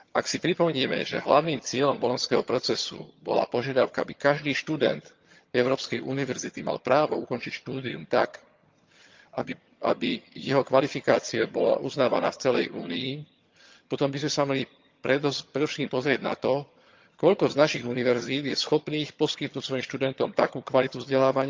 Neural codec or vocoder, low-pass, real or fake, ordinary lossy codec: vocoder, 22.05 kHz, 80 mel bands, HiFi-GAN; 7.2 kHz; fake; Opus, 24 kbps